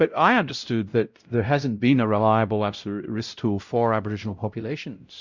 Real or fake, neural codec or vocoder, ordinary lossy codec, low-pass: fake; codec, 16 kHz, 0.5 kbps, X-Codec, WavLM features, trained on Multilingual LibriSpeech; Opus, 64 kbps; 7.2 kHz